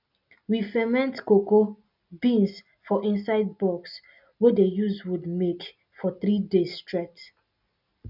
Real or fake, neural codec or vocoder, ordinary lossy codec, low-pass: real; none; none; 5.4 kHz